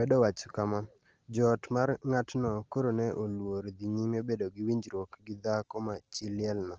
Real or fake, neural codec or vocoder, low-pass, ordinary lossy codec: real; none; 7.2 kHz; Opus, 16 kbps